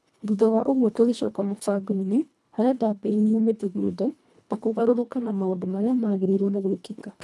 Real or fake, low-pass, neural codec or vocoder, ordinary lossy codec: fake; none; codec, 24 kHz, 1.5 kbps, HILCodec; none